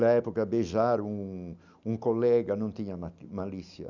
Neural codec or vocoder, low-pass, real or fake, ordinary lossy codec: none; 7.2 kHz; real; none